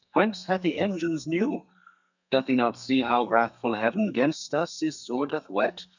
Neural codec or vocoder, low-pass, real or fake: codec, 32 kHz, 1.9 kbps, SNAC; 7.2 kHz; fake